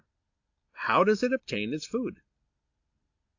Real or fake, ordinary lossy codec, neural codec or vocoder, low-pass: real; AAC, 48 kbps; none; 7.2 kHz